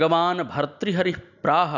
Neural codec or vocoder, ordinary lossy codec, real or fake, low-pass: none; none; real; 7.2 kHz